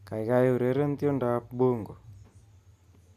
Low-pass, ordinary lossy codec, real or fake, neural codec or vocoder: 14.4 kHz; Opus, 64 kbps; real; none